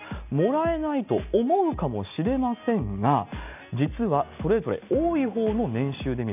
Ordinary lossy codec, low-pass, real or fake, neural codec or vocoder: none; 3.6 kHz; real; none